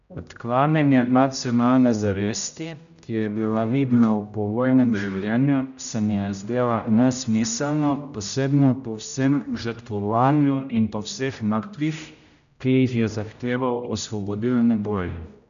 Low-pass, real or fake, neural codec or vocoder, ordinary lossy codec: 7.2 kHz; fake; codec, 16 kHz, 0.5 kbps, X-Codec, HuBERT features, trained on general audio; none